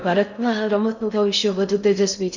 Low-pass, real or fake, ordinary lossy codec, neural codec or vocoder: 7.2 kHz; fake; none; codec, 16 kHz in and 24 kHz out, 0.6 kbps, FocalCodec, streaming, 4096 codes